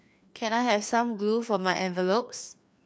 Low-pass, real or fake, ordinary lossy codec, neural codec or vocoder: none; fake; none; codec, 16 kHz, 2 kbps, FreqCodec, larger model